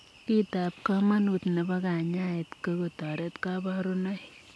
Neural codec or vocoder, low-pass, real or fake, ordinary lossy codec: none; none; real; none